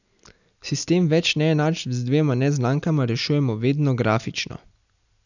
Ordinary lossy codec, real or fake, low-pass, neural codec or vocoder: none; real; 7.2 kHz; none